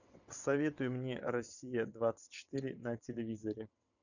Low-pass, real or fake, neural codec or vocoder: 7.2 kHz; fake; vocoder, 22.05 kHz, 80 mel bands, WaveNeXt